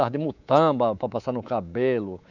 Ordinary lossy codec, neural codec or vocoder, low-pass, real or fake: none; codec, 24 kHz, 3.1 kbps, DualCodec; 7.2 kHz; fake